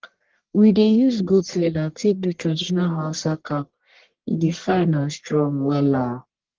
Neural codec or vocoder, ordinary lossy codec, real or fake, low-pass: codec, 44.1 kHz, 1.7 kbps, Pupu-Codec; Opus, 16 kbps; fake; 7.2 kHz